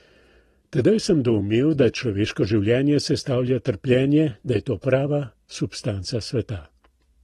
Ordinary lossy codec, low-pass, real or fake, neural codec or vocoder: AAC, 32 kbps; 19.8 kHz; real; none